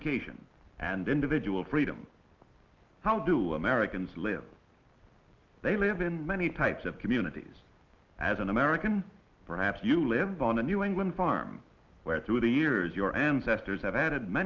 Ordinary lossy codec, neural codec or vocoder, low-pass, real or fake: Opus, 32 kbps; none; 7.2 kHz; real